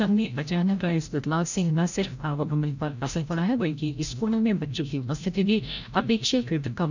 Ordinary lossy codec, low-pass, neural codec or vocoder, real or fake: none; 7.2 kHz; codec, 16 kHz, 0.5 kbps, FreqCodec, larger model; fake